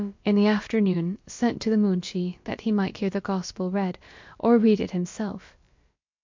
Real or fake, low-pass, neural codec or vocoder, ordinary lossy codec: fake; 7.2 kHz; codec, 16 kHz, about 1 kbps, DyCAST, with the encoder's durations; MP3, 48 kbps